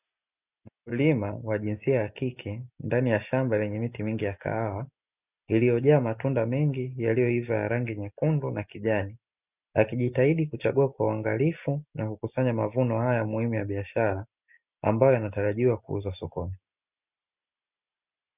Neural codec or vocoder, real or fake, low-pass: none; real; 3.6 kHz